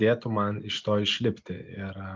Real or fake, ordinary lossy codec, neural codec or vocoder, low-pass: real; Opus, 24 kbps; none; 7.2 kHz